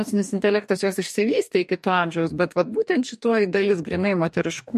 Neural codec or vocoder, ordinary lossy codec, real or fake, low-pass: codec, 44.1 kHz, 2.6 kbps, DAC; MP3, 64 kbps; fake; 14.4 kHz